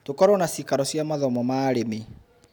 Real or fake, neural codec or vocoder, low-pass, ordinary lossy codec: real; none; none; none